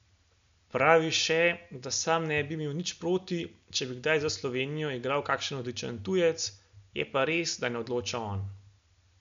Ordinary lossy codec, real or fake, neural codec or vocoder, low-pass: MP3, 64 kbps; real; none; 7.2 kHz